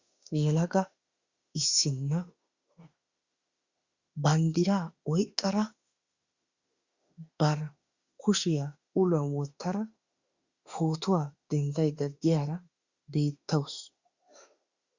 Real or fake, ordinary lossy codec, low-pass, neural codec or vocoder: fake; Opus, 64 kbps; 7.2 kHz; autoencoder, 48 kHz, 32 numbers a frame, DAC-VAE, trained on Japanese speech